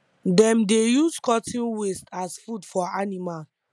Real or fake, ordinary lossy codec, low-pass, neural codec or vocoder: real; none; none; none